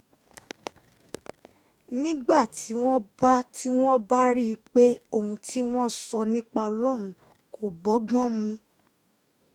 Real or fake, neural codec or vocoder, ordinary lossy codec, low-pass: fake; codec, 44.1 kHz, 2.6 kbps, DAC; none; 19.8 kHz